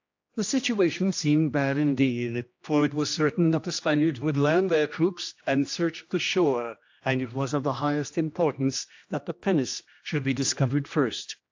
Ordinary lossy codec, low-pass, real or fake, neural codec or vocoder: AAC, 48 kbps; 7.2 kHz; fake; codec, 16 kHz, 1 kbps, X-Codec, HuBERT features, trained on general audio